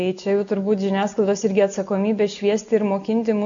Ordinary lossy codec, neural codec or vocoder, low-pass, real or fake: MP3, 64 kbps; none; 7.2 kHz; real